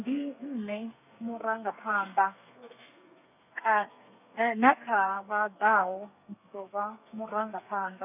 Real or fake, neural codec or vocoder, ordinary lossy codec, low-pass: fake; codec, 32 kHz, 1.9 kbps, SNAC; none; 3.6 kHz